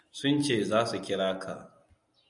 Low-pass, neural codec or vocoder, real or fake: 10.8 kHz; none; real